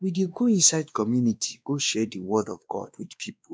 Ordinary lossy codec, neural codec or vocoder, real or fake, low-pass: none; codec, 16 kHz, 2 kbps, X-Codec, WavLM features, trained on Multilingual LibriSpeech; fake; none